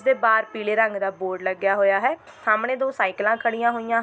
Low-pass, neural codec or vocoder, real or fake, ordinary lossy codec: none; none; real; none